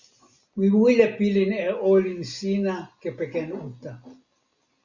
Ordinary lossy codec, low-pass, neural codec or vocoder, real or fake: Opus, 64 kbps; 7.2 kHz; none; real